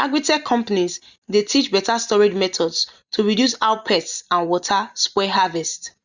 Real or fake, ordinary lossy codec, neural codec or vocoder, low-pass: real; Opus, 64 kbps; none; 7.2 kHz